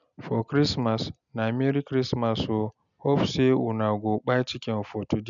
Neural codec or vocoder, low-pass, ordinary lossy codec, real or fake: none; 7.2 kHz; none; real